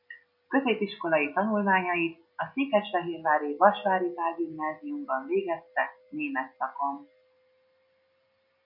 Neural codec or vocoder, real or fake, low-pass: none; real; 5.4 kHz